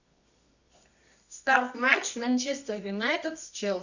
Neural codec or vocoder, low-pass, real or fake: codec, 24 kHz, 0.9 kbps, WavTokenizer, medium music audio release; 7.2 kHz; fake